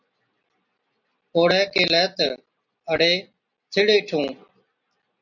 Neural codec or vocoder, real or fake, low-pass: none; real; 7.2 kHz